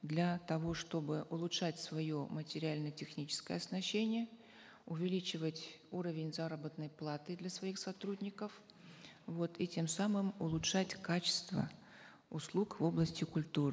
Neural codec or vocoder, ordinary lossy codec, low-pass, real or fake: none; none; none; real